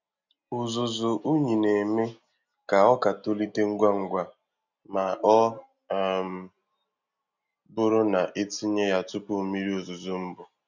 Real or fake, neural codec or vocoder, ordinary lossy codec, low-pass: real; none; none; 7.2 kHz